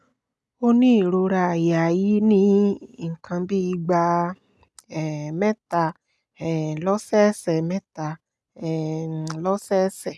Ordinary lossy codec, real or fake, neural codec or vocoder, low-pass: none; real; none; none